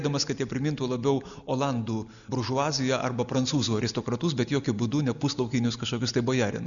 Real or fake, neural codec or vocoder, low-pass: real; none; 7.2 kHz